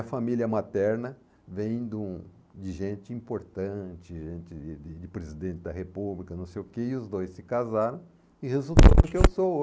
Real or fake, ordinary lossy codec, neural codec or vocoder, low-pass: real; none; none; none